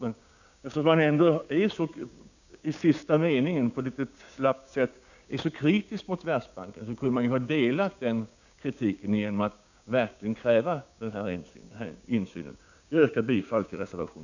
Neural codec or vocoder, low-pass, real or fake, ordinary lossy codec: codec, 16 kHz, 6 kbps, DAC; 7.2 kHz; fake; none